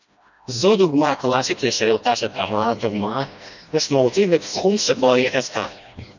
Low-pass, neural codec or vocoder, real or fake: 7.2 kHz; codec, 16 kHz, 1 kbps, FreqCodec, smaller model; fake